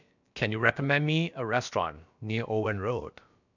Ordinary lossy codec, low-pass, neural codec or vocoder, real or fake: none; 7.2 kHz; codec, 16 kHz, about 1 kbps, DyCAST, with the encoder's durations; fake